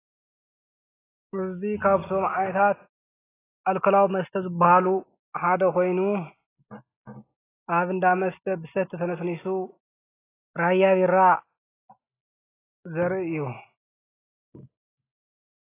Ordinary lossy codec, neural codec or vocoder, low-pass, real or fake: AAC, 16 kbps; none; 3.6 kHz; real